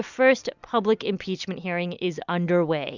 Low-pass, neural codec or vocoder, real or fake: 7.2 kHz; none; real